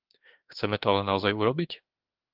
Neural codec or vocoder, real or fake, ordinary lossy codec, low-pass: autoencoder, 48 kHz, 32 numbers a frame, DAC-VAE, trained on Japanese speech; fake; Opus, 16 kbps; 5.4 kHz